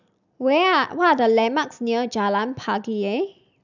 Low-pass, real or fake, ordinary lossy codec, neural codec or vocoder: 7.2 kHz; real; none; none